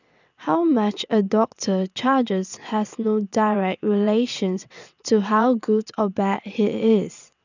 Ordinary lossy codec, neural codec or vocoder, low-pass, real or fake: none; vocoder, 22.05 kHz, 80 mel bands, WaveNeXt; 7.2 kHz; fake